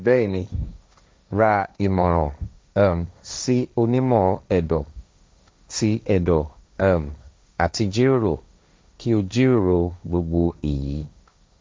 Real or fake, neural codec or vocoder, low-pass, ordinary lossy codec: fake; codec, 16 kHz, 1.1 kbps, Voila-Tokenizer; none; none